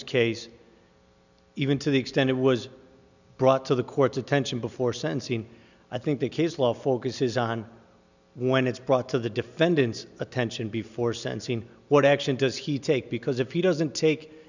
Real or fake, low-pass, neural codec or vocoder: real; 7.2 kHz; none